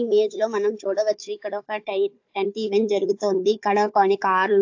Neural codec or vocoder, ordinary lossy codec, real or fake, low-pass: codec, 16 kHz, 4 kbps, FunCodec, trained on Chinese and English, 50 frames a second; AAC, 48 kbps; fake; 7.2 kHz